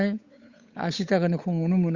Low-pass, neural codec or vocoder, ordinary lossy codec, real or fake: 7.2 kHz; codec, 16 kHz, 8 kbps, FunCodec, trained on Chinese and English, 25 frames a second; Opus, 64 kbps; fake